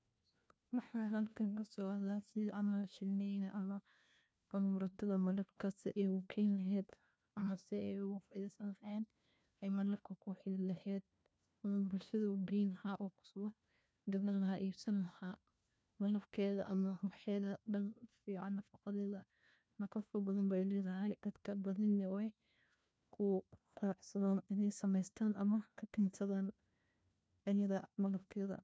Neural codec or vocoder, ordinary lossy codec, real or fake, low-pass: codec, 16 kHz, 1 kbps, FunCodec, trained on LibriTTS, 50 frames a second; none; fake; none